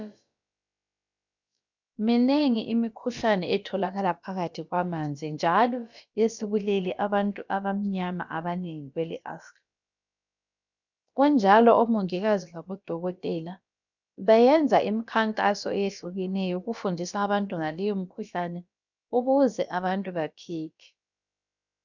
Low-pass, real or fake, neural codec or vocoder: 7.2 kHz; fake; codec, 16 kHz, about 1 kbps, DyCAST, with the encoder's durations